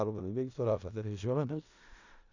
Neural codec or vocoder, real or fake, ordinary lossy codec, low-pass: codec, 16 kHz in and 24 kHz out, 0.4 kbps, LongCat-Audio-Codec, four codebook decoder; fake; none; 7.2 kHz